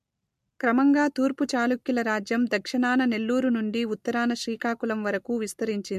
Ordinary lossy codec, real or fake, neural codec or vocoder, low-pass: MP3, 64 kbps; real; none; 14.4 kHz